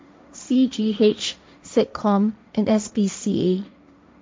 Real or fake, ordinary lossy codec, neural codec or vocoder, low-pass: fake; none; codec, 16 kHz, 1.1 kbps, Voila-Tokenizer; none